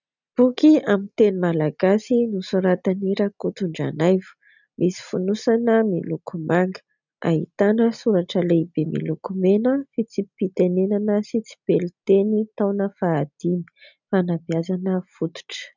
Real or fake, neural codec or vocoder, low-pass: real; none; 7.2 kHz